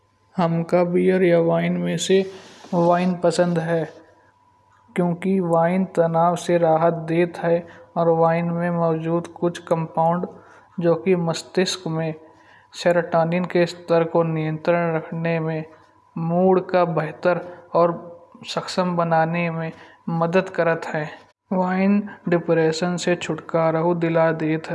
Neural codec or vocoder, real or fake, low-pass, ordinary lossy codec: none; real; none; none